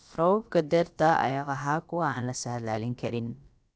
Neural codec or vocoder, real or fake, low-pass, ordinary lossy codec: codec, 16 kHz, about 1 kbps, DyCAST, with the encoder's durations; fake; none; none